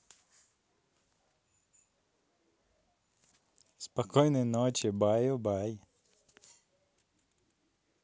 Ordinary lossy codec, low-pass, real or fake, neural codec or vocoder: none; none; real; none